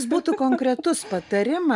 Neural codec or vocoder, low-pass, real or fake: none; 10.8 kHz; real